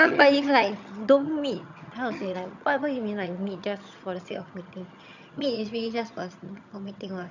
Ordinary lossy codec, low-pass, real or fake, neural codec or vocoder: none; 7.2 kHz; fake; vocoder, 22.05 kHz, 80 mel bands, HiFi-GAN